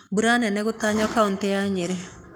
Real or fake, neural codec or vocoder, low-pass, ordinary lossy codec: fake; codec, 44.1 kHz, 7.8 kbps, Pupu-Codec; none; none